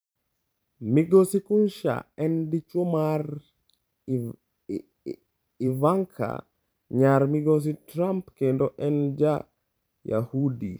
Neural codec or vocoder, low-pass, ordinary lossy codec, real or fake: vocoder, 44.1 kHz, 128 mel bands every 512 samples, BigVGAN v2; none; none; fake